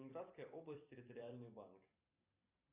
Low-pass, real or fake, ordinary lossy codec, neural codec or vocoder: 3.6 kHz; fake; Opus, 64 kbps; vocoder, 44.1 kHz, 128 mel bands every 256 samples, BigVGAN v2